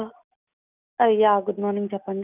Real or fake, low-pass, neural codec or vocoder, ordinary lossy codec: real; 3.6 kHz; none; none